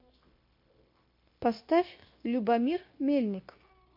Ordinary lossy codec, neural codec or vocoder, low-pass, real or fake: MP3, 32 kbps; codec, 16 kHz, 0.9 kbps, LongCat-Audio-Codec; 5.4 kHz; fake